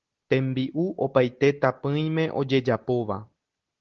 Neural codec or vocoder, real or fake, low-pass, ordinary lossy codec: none; real; 7.2 kHz; Opus, 16 kbps